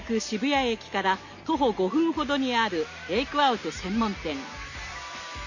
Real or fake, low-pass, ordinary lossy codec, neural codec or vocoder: real; 7.2 kHz; none; none